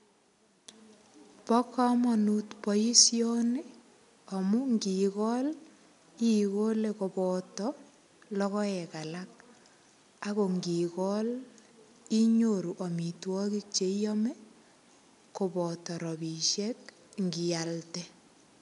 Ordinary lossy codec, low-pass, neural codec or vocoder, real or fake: AAC, 96 kbps; 10.8 kHz; none; real